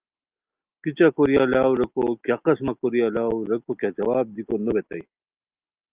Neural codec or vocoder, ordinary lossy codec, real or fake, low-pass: none; Opus, 24 kbps; real; 3.6 kHz